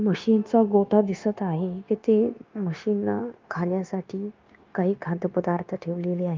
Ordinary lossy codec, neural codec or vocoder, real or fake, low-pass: Opus, 24 kbps; codec, 16 kHz, 0.9 kbps, LongCat-Audio-Codec; fake; 7.2 kHz